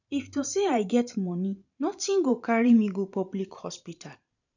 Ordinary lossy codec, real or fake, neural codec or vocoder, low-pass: none; fake; vocoder, 44.1 kHz, 128 mel bands every 512 samples, BigVGAN v2; 7.2 kHz